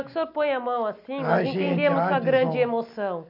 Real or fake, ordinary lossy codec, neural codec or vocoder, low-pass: real; none; none; 5.4 kHz